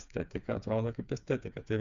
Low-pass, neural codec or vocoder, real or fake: 7.2 kHz; codec, 16 kHz, 4 kbps, FreqCodec, smaller model; fake